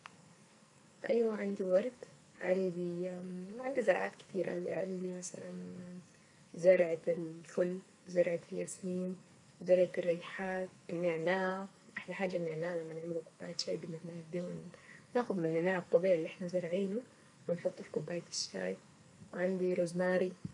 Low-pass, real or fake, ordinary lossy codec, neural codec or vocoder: 10.8 kHz; fake; none; codec, 44.1 kHz, 2.6 kbps, SNAC